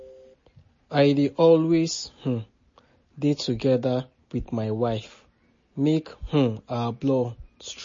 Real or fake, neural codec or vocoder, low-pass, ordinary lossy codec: real; none; 7.2 kHz; MP3, 32 kbps